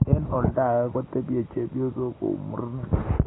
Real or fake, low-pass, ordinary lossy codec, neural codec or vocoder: real; 7.2 kHz; AAC, 16 kbps; none